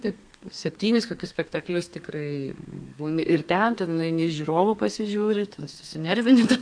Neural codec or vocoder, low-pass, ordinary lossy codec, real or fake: codec, 32 kHz, 1.9 kbps, SNAC; 9.9 kHz; AAC, 64 kbps; fake